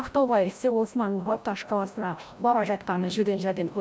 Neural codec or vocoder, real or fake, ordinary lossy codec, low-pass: codec, 16 kHz, 0.5 kbps, FreqCodec, larger model; fake; none; none